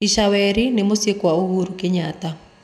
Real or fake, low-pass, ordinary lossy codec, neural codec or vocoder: real; 14.4 kHz; none; none